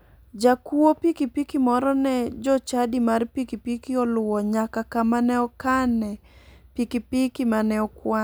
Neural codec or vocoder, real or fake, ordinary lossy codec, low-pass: none; real; none; none